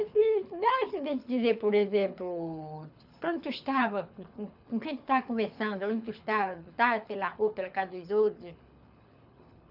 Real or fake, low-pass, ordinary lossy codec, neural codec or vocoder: fake; 5.4 kHz; none; codec, 24 kHz, 6 kbps, HILCodec